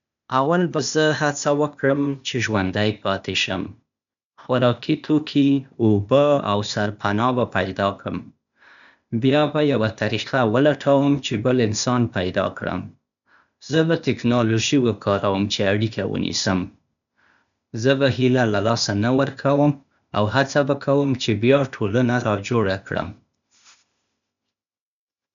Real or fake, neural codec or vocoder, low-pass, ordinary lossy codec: fake; codec, 16 kHz, 0.8 kbps, ZipCodec; 7.2 kHz; MP3, 96 kbps